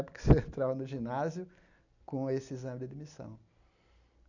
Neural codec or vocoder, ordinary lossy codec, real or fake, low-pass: none; none; real; 7.2 kHz